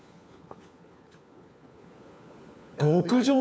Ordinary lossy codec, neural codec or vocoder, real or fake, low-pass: none; codec, 16 kHz, 4 kbps, FunCodec, trained on LibriTTS, 50 frames a second; fake; none